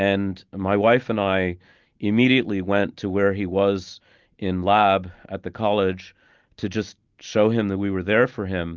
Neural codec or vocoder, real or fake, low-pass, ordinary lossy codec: none; real; 7.2 kHz; Opus, 32 kbps